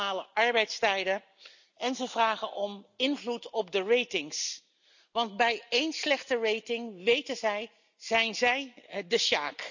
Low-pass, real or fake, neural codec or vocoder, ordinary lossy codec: 7.2 kHz; real; none; none